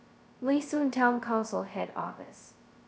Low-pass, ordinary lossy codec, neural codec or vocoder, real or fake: none; none; codec, 16 kHz, 0.2 kbps, FocalCodec; fake